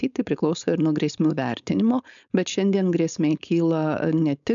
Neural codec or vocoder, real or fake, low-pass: codec, 16 kHz, 4.8 kbps, FACodec; fake; 7.2 kHz